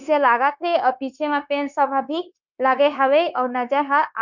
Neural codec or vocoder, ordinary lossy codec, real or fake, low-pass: codec, 16 kHz, 0.9 kbps, LongCat-Audio-Codec; none; fake; 7.2 kHz